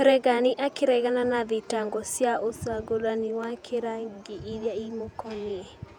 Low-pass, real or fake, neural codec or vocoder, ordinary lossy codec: 19.8 kHz; fake; vocoder, 48 kHz, 128 mel bands, Vocos; none